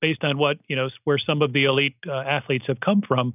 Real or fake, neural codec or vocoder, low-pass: real; none; 3.6 kHz